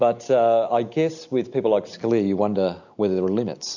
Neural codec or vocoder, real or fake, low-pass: none; real; 7.2 kHz